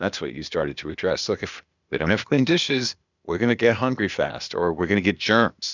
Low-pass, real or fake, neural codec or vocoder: 7.2 kHz; fake; codec, 16 kHz, 0.8 kbps, ZipCodec